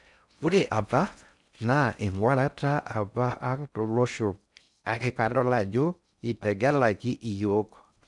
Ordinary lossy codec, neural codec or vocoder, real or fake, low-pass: none; codec, 16 kHz in and 24 kHz out, 0.6 kbps, FocalCodec, streaming, 4096 codes; fake; 10.8 kHz